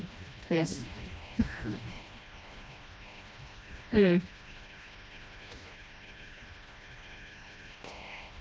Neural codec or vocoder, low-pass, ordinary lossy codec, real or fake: codec, 16 kHz, 1 kbps, FreqCodec, smaller model; none; none; fake